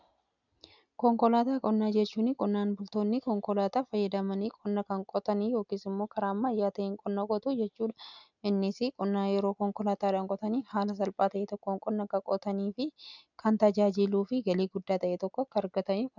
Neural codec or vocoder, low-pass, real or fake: none; 7.2 kHz; real